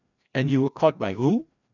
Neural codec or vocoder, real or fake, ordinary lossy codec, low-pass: codec, 16 kHz, 1 kbps, FreqCodec, larger model; fake; none; 7.2 kHz